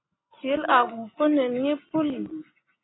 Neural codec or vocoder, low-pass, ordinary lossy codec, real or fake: none; 7.2 kHz; AAC, 16 kbps; real